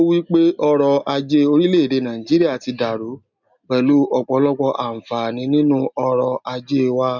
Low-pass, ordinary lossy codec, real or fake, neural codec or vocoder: 7.2 kHz; none; real; none